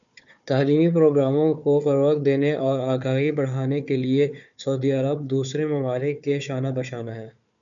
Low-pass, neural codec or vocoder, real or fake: 7.2 kHz; codec, 16 kHz, 4 kbps, FunCodec, trained on Chinese and English, 50 frames a second; fake